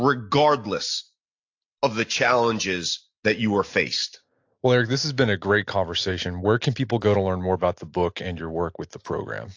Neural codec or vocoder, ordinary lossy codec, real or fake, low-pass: none; AAC, 48 kbps; real; 7.2 kHz